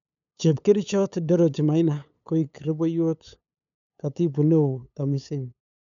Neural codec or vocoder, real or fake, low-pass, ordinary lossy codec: codec, 16 kHz, 8 kbps, FunCodec, trained on LibriTTS, 25 frames a second; fake; 7.2 kHz; none